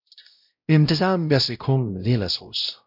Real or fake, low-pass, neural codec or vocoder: fake; 5.4 kHz; codec, 16 kHz, 0.5 kbps, X-Codec, WavLM features, trained on Multilingual LibriSpeech